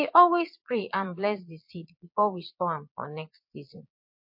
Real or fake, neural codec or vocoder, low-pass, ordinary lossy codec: fake; vocoder, 22.05 kHz, 80 mel bands, Vocos; 5.4 kHz; MP3, 32 kbps